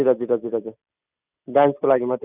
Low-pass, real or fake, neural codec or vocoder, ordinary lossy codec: 3.6 kHz; real; none; none